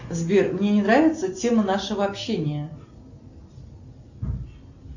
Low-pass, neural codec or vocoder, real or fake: 7.2 kHz; none; real